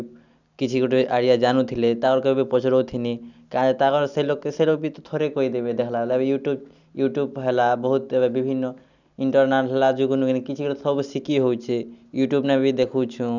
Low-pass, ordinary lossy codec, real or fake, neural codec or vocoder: 7.2 kHz; none; real; none